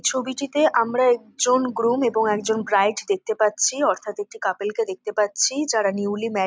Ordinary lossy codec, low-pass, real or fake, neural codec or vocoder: none; none; real; none